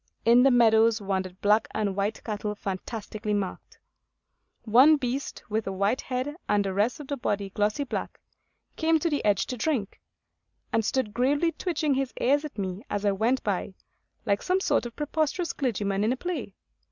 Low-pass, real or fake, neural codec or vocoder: 7.2 kHz; real; none